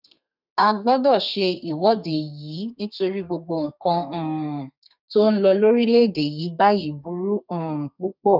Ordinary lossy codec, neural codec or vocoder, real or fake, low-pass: none; codec, 32 kHz, 1.9 kbps, SNAC; fake; 5.4 kHz